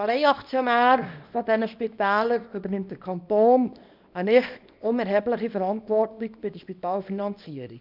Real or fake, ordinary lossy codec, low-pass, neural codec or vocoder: fake; none; 5.4 kHz; codec, 24 kHz, 0.9 kbps, WavTokenizer, small release